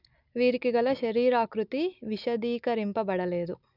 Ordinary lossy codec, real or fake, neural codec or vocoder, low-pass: none; real; none; 5.4 kHz